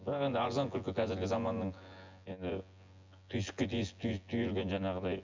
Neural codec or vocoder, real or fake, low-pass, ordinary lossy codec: vocoder, 24 kHz, 100 mel bands, Vocos; fake; 7.2 kHz; MP3, 64 kbps